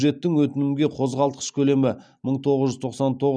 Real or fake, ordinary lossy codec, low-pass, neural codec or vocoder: real; none; none; none